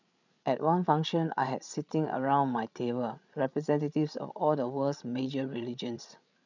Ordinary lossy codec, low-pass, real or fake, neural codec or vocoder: none; 7.2 kHz; fake; codec, 16 kHz, 8 kbps, FreqCodec, larger model